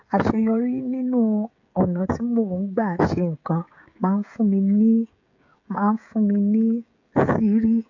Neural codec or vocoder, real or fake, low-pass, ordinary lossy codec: codec, 16 kHz, 16 kbps, FreqCodec, smaller model; fake; 7.2 kHz; AAC, 48 kbps